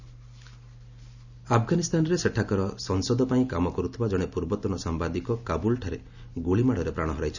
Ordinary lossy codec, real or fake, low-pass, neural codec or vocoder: none; real; 7.2 kHz; none